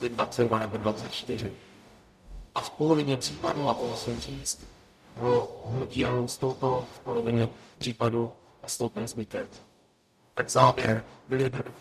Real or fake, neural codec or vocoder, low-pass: fake; codec, 44.1 kHz, 0.9 kbps, DAC; 14.4 kHz